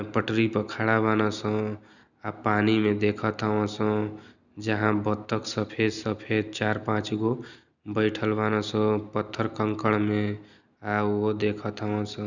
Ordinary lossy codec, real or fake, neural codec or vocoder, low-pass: none; real; none; 7.2 kHz